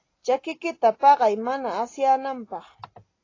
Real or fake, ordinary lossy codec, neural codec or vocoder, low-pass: real; AAC, 32 kbps; none; 7.2 kHz